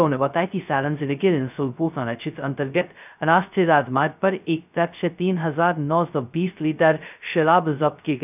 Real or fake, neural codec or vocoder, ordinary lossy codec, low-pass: fake; codec, 16 kHz, 0.2 kbps, FocalCodec; none; 3.6 kHz